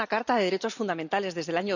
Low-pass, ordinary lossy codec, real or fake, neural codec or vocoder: 7.2 kHz; none; real; none